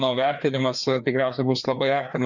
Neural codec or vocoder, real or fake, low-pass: codec, 16 kHz, 2 kbps, FreqCodec, larger model; fake; 7.2 kHz